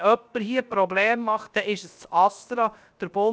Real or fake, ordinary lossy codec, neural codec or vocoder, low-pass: fake; none; codec, 16 kHz, 0.7 kbps, FocalCodec; none